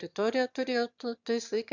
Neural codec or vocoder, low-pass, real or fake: autoencoder, 22.05 kHz, a latent of 192 numbers a frame, VITS, trained on one speaker; 7.2 kHz; fake